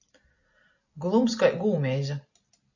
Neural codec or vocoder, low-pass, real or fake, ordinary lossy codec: none; 7.2 kHz; real; AAC, 48 kbps